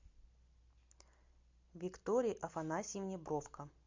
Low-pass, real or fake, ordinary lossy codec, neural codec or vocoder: 7.2 kHz; real; MP3, 64 kbps; none